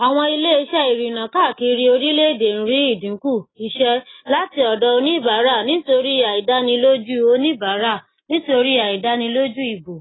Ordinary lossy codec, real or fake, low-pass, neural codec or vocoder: AAC, 16 kbps; real; 7.2 kHz; none